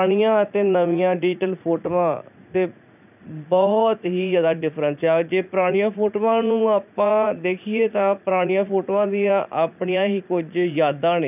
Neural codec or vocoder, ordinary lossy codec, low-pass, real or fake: vocoder, 44.1 kHz, 80 mel bands, Vocos; none; 3.6 kHz; fake